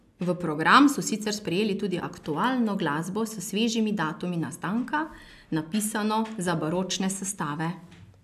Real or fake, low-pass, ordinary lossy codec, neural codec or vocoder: real; 14.4 kHz; AAC, 96 kbps; none